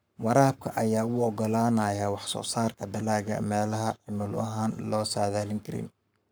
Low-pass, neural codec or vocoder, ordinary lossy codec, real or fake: none; codec, 44.1 kHz, 7.8 kbps, Pupu-Codec; none; fake